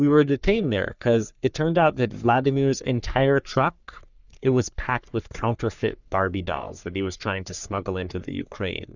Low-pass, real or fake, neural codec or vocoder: 7.2 kHz; fake; codec, 44.1 kHz, 3.4 kbps, Pupu-Codec